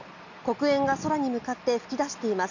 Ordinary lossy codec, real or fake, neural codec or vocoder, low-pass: none; real; none; 7.2 kHz